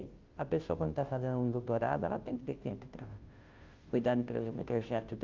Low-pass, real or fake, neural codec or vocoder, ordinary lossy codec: 7.2 kHz; fake; codec, 16 kHz, 0.5 kbps, FunCodec, trained on Chinese and English, 25 frames a second; Opus, 24 kbps